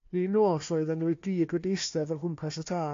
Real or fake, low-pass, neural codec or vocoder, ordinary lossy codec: fake; 7.2 kHz; codec, 16 kHz, 1 kbps, FunCodec, trained on Chinese and English, 50 frames a second; AAC, 48 kbps